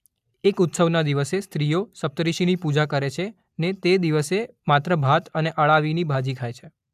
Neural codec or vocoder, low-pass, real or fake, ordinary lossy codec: none; 14.4 kHz; real; AAC, 96 kbps